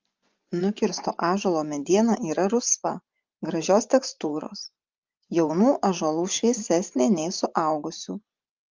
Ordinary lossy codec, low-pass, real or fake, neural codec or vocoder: Opus, 32 kbps; 7.2 kHz; fake; vocoder, 24 kHz, 100 mel bands, Vocos